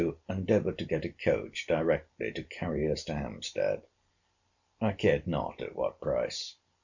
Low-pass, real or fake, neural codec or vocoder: 7.2 kHz; real; none